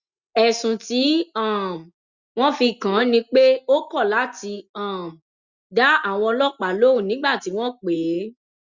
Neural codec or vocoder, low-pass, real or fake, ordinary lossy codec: none; 7.2 kHz; real; Opus, 64 kbps